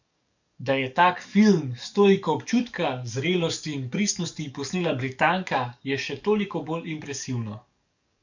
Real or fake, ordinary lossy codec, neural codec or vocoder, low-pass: fake; none; codec, 44.1 kHz, 7.8 kbps, DAC; 7.2 kHz